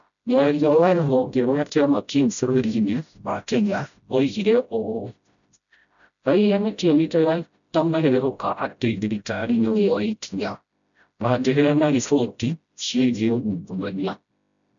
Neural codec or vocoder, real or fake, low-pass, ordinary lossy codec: codec, 16 kHz, 0.5 kbps, FreqCodec, smaller model; fake; 7.2 kHz; MP3, 96 kbps